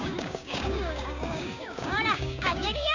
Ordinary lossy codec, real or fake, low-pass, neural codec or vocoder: none; fake; 7.2 kHz; codec, 16 kHz, 6 kbps, DAC